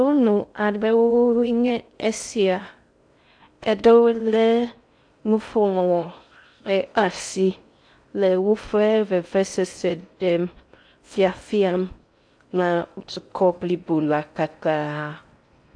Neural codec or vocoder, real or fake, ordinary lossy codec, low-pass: codec, 16 kHz in and 24 kHz out, 0.6 kbps, FocalCodec, streaming, 2048 codes; fake; AAC, 64 kbps; 9.9 kHz